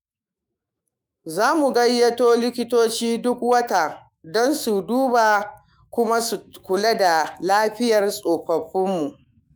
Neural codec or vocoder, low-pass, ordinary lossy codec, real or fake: autoencoder, 48 kHz, 128 numbers a frame, DAC-VAE, trained on Japanese speech; none; none; fake